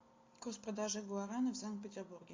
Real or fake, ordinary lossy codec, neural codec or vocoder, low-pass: real; MP3, 48 kbps; none; 7.2 kHz